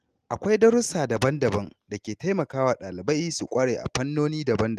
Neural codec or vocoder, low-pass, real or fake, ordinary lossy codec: none; 14.4 kHz; real; Opus, 24 kbps